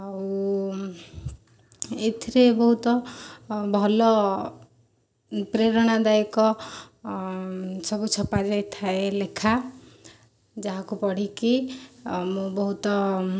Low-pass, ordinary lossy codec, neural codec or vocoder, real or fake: none; none; none; real